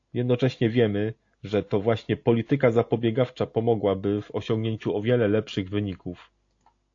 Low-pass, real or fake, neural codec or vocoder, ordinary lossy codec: 7.2 kHz; real; none; AAC, 48 kbps